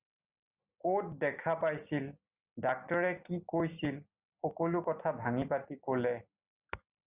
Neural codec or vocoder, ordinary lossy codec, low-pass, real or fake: none; Opus, 64 kbps; 3.6 kHz; real